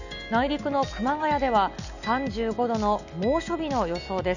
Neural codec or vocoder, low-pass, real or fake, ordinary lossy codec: none; 7.2 kHz; real; none